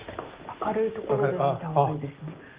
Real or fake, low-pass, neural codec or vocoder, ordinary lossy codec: real; 3.6 kHz; none; Opus, 32 kbps